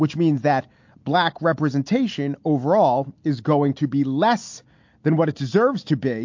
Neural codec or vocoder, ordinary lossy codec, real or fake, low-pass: none; MP3, 48 kbps; real; 7.2 kHz